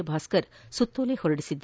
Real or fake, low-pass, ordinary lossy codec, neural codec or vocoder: real; none; none; none